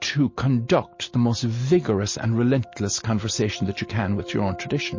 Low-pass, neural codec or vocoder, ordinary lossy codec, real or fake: 7.2 kHz; none; MP3, 32 kbps; real